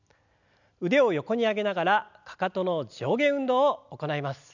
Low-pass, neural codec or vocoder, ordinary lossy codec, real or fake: 7.2 kHz; none; none; real